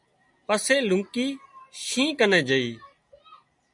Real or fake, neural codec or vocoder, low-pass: real; none; 10.8 kHz